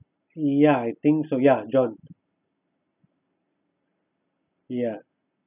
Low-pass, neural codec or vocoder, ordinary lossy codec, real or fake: 3.6 kHz; none; none; real